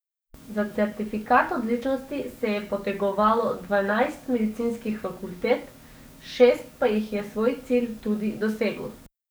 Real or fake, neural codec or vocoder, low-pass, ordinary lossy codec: fake; codec, 44.1 kHz, 7.8 kbps, DAC; none; none